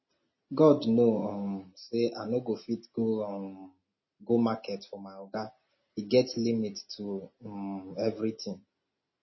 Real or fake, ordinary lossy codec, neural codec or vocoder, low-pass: real; MP3, 24 kbps; none; 7.2 kHz